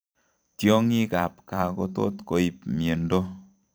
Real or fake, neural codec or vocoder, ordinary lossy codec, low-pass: fake; vocoder, 44.1 kHz, 128 mel bands every 512 samples, BigVGAN v2; none; none